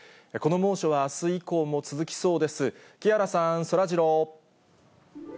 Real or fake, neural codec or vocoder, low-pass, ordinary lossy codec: real; none; none; none